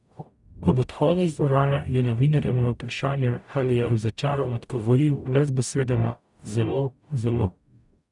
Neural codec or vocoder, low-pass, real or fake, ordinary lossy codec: codec, 44.1 kHz, 0.9 kbps, DAC; 10.8 kHz; fake; none